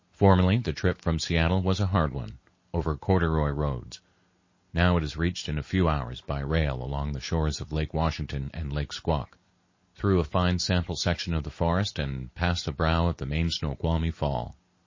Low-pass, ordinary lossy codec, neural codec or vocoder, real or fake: 7.2 kHz; MP3, 32 kbps; none; real